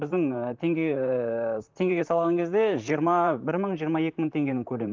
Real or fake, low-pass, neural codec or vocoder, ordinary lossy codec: fake; 7.2 kHz; vocoder, 44.1 kHz, 128 mel bands, Pupu-Vocoder; Opus, 32 kbps